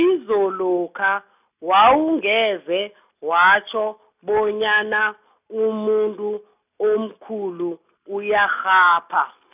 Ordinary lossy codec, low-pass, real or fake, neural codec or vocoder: none; 3.6 kHz; real; none